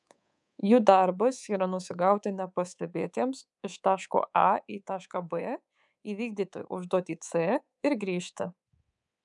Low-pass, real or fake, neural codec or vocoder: 10.8 kHz; fake; codec, 24 kHz, 3.1 kbps, DualCodec